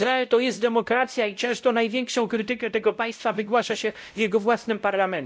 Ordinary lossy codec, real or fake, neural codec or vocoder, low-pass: none; fake; codec, 16 kHz, 0.5 kbps, X-Codec, WavLM features, trained on Multilingual LibriSpeech; none